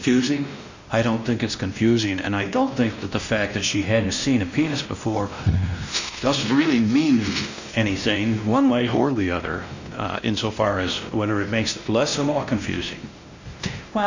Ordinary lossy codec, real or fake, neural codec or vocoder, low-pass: Opus, 64 kbps; fake; codec, 16 kHz, 1 kbps, X-Codec, WavLM features, trained on Multilingual LibriSpeech; 7.2 kHz